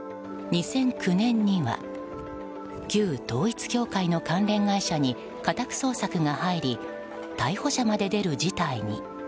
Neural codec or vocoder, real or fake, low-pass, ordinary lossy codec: none; real; none; none